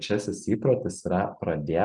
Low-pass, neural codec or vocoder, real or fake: 10.8 kHz; none; real